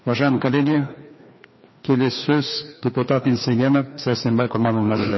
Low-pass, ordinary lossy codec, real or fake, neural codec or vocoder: 7.2 kHz; MP3, 24 kbps; fake; codec, 16 kHz, 2 kbps, FreqCodec, larger model